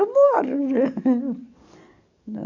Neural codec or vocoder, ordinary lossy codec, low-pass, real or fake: codec, 44.1 kHz, 7.8 kbps, DAC; none; 7.2 kHz; fake